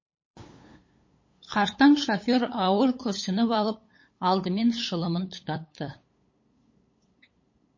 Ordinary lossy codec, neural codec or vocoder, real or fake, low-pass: MP3, 32 kbps; codec, 16 kHz, 8 kbps, FunCodec, trained on LibriTTS, 25 frames a second; fake; 7.2 kHz